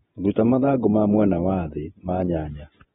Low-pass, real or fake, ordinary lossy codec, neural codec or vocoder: 19.8 kHz; fake; AAC, 16 kbps; vocoder, 48 kHz, 128 mel bands, Vocos